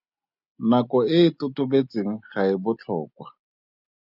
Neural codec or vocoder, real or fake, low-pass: none; real; 5.4 kHz